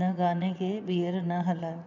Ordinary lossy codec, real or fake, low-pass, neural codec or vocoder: none; fake; 7.2 kHz; vocoder, 22.05 kHz, 80 mel bands, Vocos